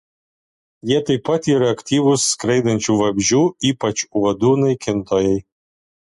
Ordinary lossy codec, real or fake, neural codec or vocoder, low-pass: MP3, 48 kbps; real; none; 14.4 kHz